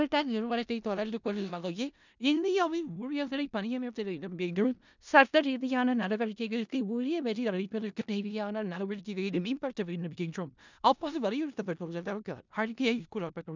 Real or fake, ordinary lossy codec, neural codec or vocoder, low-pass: fake; none; codec, 16 kHz in and 24 kHz out, 0.4 kbps, LongCat-Audio-Codec, four codebook decoder; 7.2 kHz